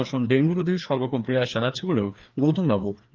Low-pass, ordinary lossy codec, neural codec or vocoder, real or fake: 7.2 kHz; Opus, 24 kbps; codec, 16 kHz, 2 kbps, FreqCodec, larger model; fake